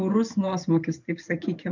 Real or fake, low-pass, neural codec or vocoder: fake; 7.2 kHz; vocoder, 44.1 kHz, 128 mel bands every 512 samples, BigVGAN v2